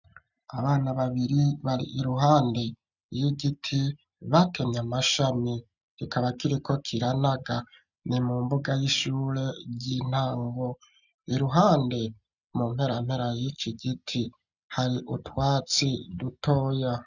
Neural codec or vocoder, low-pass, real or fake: none; 7.2 kHz; real